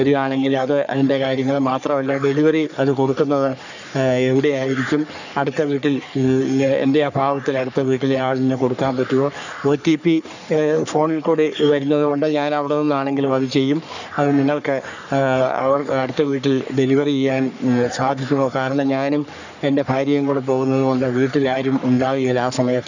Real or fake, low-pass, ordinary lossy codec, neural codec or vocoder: fake; 7.2 kHz; none; codec, 44.1 kHz, 3.4 kbps, Pupu-Codec